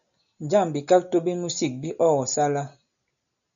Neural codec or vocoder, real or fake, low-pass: none; real; 7.2 kHz